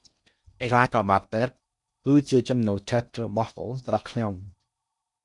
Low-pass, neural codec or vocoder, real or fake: 10.8 kHz; codec, 16 kHz in and 24 kHz out, 0.6 kbps, FocalCodec, streaming, 4096 codes; fake